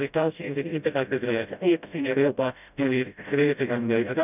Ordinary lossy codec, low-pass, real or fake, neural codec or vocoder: none; 3.6 kHz; fake; codec, 16 kHz, 0.5 kbps, FreqCodec, smaller model